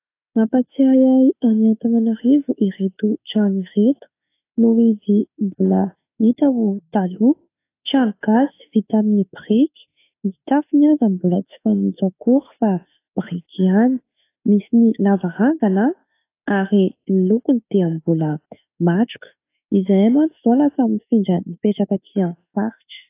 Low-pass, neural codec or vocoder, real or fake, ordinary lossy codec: 3.6 kHz; autoencoder, 48 kHz, 32 numbers a frame, DAC-VAE, trained on Japanese speech; fake; AAC, 24 kbps